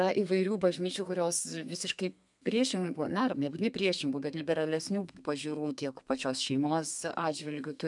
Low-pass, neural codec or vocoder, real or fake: 10.8 kHz; codec, 32 kHz, 1.9 kbps, SNAC; fake